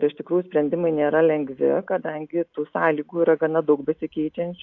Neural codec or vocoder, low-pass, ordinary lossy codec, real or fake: none; 7.2 kHz; AAC, 48 kbps; real